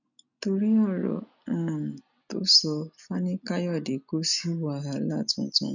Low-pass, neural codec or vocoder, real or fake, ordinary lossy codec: 7.2 kHz; none; real; none